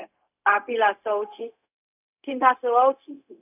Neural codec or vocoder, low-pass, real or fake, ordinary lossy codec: codec, 16 kHz, 0.4 kbps, LongCat-Audio-Codec; 3.6 kHz; fake; none